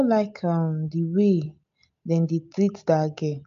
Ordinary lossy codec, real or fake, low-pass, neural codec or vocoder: MP3, 64 kbps; real; 7.2 kHz; none